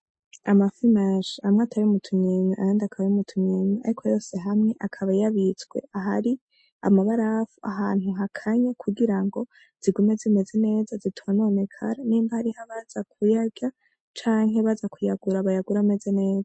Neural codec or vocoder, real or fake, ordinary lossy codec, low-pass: none; real; MP3, 32 kbps; 9.9 kHz